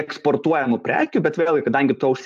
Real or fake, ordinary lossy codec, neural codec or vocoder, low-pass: real; AAC, 96 kbps; none; 14.4 kHz